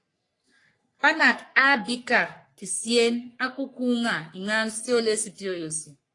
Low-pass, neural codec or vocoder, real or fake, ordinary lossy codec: 10.8 kHz; codec, 44.1 kHz, 3.4 kbps, Pupu-Codec; fake; AAC, 48 kbps